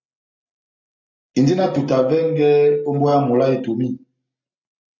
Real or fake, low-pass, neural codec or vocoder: real; 7.2 kHz; none